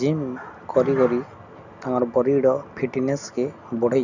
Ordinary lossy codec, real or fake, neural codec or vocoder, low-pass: none; real; none; 7.2 kHz